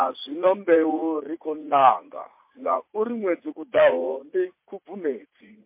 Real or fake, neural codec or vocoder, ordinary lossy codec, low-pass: fake; vocoder, 44.1 kHz, 80 mel bands, Vocos; MP3, 24 kbps; 3.6 kHz